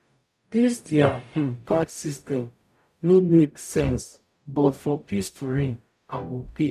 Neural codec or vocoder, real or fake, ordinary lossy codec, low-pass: codec, 44.1 kHz, 0.9 kbps, DAC; fake; none; 14.4 kHz